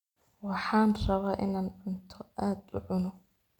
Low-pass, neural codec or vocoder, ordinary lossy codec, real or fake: 19.8 kHz; none; none; real